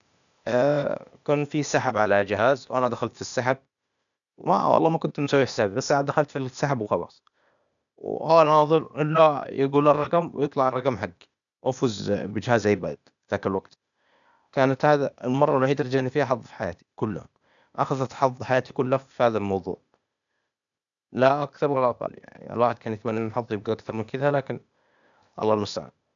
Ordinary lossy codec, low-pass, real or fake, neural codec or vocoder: none; 7.2 kHz; fake; codec, 16 kHz, 0.8 kbps, ZipCodec